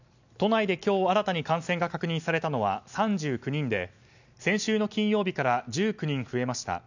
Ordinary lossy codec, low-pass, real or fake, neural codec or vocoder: none; 7.2 kHz; real; none